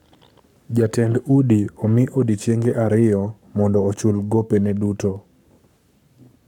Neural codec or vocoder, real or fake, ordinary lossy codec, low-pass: codec, 44.1 kHz, 7.8 kbps, Pupu-Codec; fake; none; 19.8 kHz